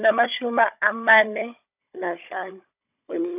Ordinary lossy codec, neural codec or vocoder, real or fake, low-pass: none; codec, 16 kHz, 16 kbps, FunCodec, trained on Chinese and English, 50 frames a second; fake; 3.6 kHz